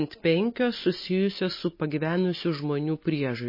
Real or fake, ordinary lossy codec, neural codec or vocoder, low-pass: real; MP3, 24 kbps; none; 5.4 kHz